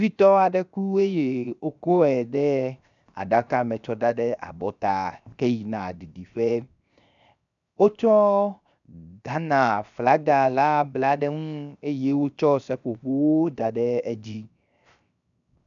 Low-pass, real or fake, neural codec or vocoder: 7.2 kHz; fake; codec, 16 kHz, 0.7 kbps, FocalCodec